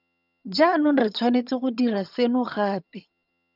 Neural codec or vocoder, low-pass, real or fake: vocoder, 22.05 kHz, 80 mel bands, HiFi-GAN; 5.4 kHz; fake